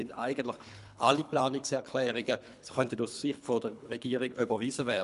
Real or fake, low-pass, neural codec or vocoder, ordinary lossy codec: fake; 10.8 kHz; codec, 24 kHz, 3 kbps, HILCodec; none